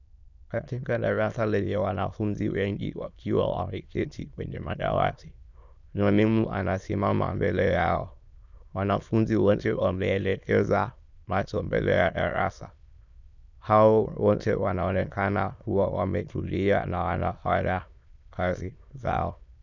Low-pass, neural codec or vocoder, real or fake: 7.2 kHz; autoencoder, 22.05 kHz, a latent of 192 numbers a frame, VITS, trained on many speakers; fake